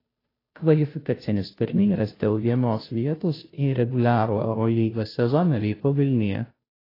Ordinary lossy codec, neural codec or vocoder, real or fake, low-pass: AAC, 24 kbps; codec, 16 kHz, 0.5 kbps, FunCodec, trained on Chinese and English, 25 frames a second; fake; 5.4 kHz